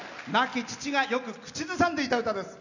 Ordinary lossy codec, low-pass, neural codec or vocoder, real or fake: none; 7.2 kHz; none; real